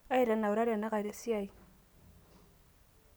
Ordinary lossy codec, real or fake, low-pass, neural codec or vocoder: none; real; none; none